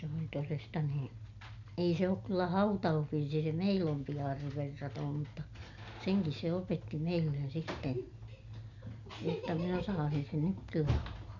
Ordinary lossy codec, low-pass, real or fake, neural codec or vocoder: none; 7.2 kHz; real; none